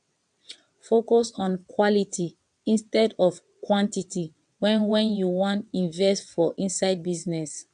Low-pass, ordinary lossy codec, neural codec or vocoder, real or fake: 9.9 kHz; AAC, 96 kbps; vocoder, 22.05 kHz, 80 mel bands, WaveNeXt; fake